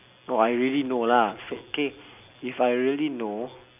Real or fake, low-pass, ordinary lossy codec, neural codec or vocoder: real; 3.6 kHz; none; none